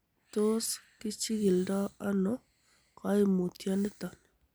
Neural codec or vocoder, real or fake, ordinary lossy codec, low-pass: none; real; none; none